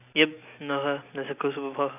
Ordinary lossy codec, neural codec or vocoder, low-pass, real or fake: none; none; 3.6 kHz; real